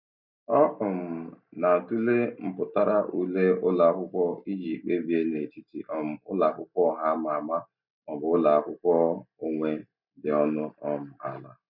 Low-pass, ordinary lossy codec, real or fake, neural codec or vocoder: 5.4 kHz; none; real; none